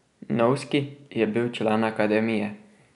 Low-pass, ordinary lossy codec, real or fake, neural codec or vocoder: 10.8 kHz; none; real; none